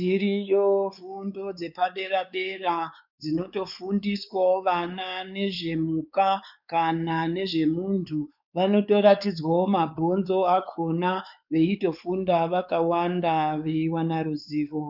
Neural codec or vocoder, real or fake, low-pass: codec, 16 kHz, 4 kbps, X-Codec, WavLM features, trained on Multilingual LibriSpeech; fake; 5.4 kHz